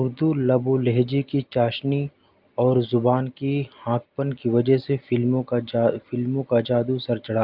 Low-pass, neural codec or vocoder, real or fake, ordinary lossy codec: 5.4 kHz; none; real; Opus, 24 kbps